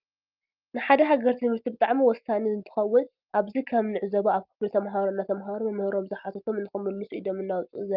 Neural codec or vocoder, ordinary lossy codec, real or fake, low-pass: none; Opus, 24 kbps; real; 5.4 kHz